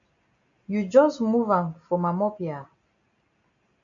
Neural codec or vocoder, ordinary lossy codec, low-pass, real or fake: none; AAC, 64 kbps; 7.2 kHz; real